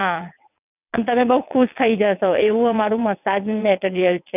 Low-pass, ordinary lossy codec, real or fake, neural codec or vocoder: 3.6 kHz; none; fake; vocoder, 22.05 kHz, 80 mel bands, WaveNeXt